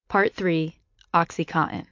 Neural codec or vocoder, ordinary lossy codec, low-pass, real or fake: none; MP3, 48 kbps; 7.2 kHz; real